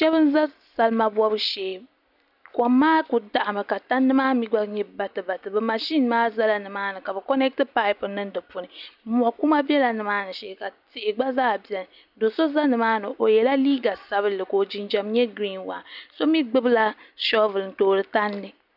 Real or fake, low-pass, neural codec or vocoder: real; 5.4 kHz; none